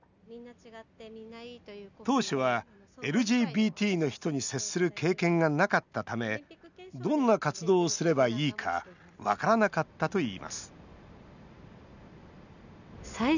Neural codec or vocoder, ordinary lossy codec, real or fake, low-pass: none; none; real; 7.2 kHz